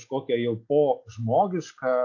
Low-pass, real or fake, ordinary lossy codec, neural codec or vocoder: 7.2 kHz; real; AAC, 48 kbps; none